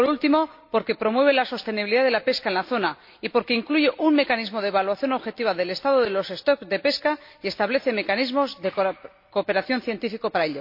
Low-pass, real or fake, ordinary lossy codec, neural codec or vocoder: 5.4 kHz; real; MP3, 32 kbps; none